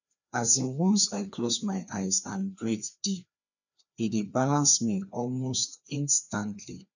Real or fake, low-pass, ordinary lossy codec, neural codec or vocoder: fake; 7.2 kHz; none; codec, 16 kHz, 2 kbps, FreqCodec, larger model